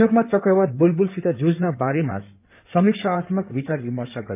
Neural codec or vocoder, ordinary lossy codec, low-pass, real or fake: codec, 16 kHz in and 24 kHz out, 2.2 kbps, FireRedTTS-2 codec; none; 3.6 kHz; fake